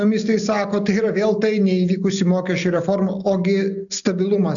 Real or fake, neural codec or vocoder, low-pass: real; none; 7.2 kHz